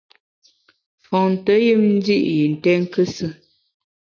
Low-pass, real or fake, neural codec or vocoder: 7.2 kHz; real; none